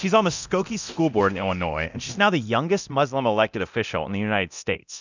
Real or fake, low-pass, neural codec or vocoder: fake; 7.2 kHz; codec, 24 kHz, 0.9 kbps, DualCodec